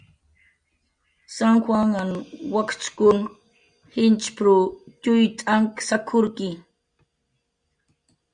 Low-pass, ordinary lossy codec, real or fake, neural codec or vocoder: 9.9 kHz; Opus, 64 kbps; real; none